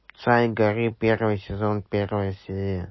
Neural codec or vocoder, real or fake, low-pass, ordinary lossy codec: none; real; 7.2 kHz; MP3, 24 kbps